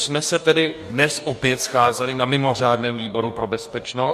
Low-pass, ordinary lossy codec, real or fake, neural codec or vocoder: 14.4 kHz; MP3, 64 kbps; fake; codec, 44.1 kHz, 2.6 kbps, DAC